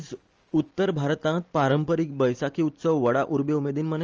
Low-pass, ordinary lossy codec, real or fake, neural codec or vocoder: 7.2 kHz; Opus, 24 kbps; real; none